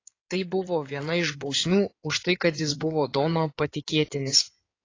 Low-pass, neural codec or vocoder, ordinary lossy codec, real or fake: 7.2 kHz; codec, 16 kHz in and 24 kHz out, 2.2 kbps, FireRedTTS-2 codec; AAC, 32 kbps; fake